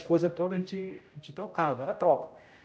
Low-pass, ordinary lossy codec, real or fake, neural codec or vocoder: none; none; fake; codec, 16 kHz, 0.5 kbps, X-Codec, HuBERT features, trained on general audio